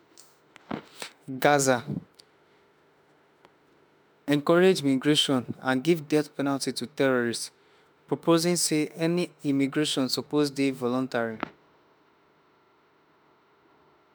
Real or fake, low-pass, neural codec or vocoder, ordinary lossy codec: fake; none; autoencoder, 48 kHz, 32 numbers a frame, DAC-VAE, trained on Japanese speech; none